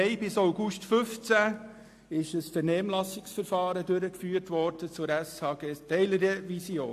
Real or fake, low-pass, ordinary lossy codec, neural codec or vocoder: real; 14.4 kHz; AAC, 64 kbps; none